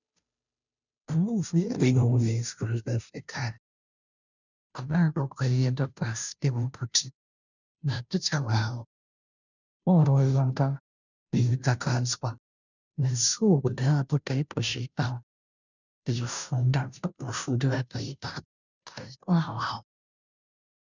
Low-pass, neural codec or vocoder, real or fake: 7.2 kHz; codec, 16 kHz, 0.5 kbps, FunCodec, trained on Chinese and English, 25 frames a second; fake